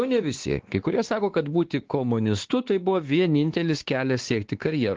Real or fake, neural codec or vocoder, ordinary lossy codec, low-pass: fake; codec, 16 kHz, 2 kbps, X-Codec, WavLM features, trained on Multilingual LibriSpeech; Opus, 16 kbps; 7.2 kHz